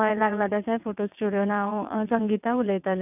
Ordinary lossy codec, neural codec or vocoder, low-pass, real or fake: none; vocoder, 22.05 kHz, 80 mel bands, WaveNeXt; 3.6 kHz; fake